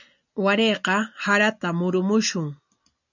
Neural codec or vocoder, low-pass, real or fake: none; 7.2 kHz; real